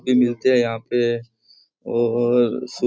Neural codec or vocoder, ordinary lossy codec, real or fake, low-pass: none; none; real; none